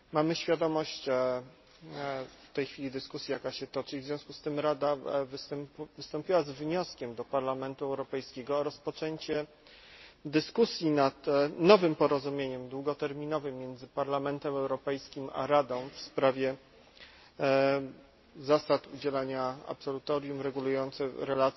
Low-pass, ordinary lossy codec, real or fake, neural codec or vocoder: 7.2 kHz; MP3, 24 kbps; real; none